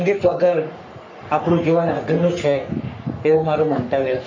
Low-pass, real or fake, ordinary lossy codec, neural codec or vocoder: 7.2 kHz; fake; MP3, 64 kbps; codec, 44.1 kHz, 3.4 kbps, Pupu-Codec